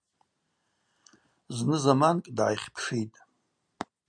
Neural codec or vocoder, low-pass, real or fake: none; 9.9 kHz; real